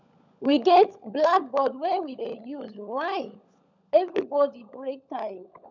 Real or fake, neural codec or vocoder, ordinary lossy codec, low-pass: fake; codec, 16 kHz, 16 kbps, FunCodec, trained on LibriTTS, 50 frames a second; none; 7.2 kHz